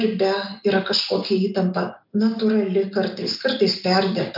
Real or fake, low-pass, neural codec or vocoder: real; 5.4 kHz; none